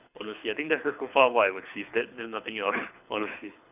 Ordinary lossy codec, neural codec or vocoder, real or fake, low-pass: none; codec, 24 kHz, 6 kbps, HILCodec; fake; 3.6 kHz